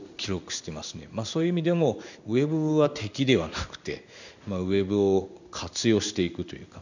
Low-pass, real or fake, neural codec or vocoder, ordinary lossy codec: 7.2 kHz; fake; codec, 16 kHz in and 24 kHz out, 1 kbps, XY-Tokenizer; none